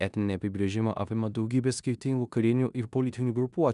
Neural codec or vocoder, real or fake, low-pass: codec, 16 kHz in and 24 kHz out, 0.9 kbps, LongCat-Audio-Codec, four codebook decoder; fake; 10.8 kHz